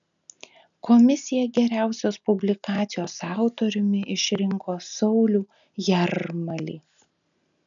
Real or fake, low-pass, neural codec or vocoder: real; 7.2 kHz; none